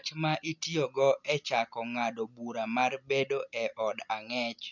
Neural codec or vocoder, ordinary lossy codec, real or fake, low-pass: none; none; real; 7.2 kHz